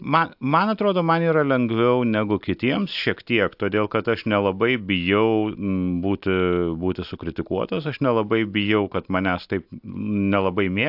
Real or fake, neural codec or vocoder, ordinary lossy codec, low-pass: real; none; AAC, 48 kbps; 5.4 kHz